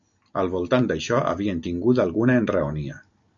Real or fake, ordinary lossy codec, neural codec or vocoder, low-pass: real; AAC, 64 kbps; none; 7.2 kHz